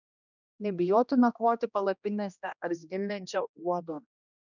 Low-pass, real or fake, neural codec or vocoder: 7.2 kHz; fake; codec, 16 kHz, 1 kbps, X-Codec, HuBERT features, trained on general audio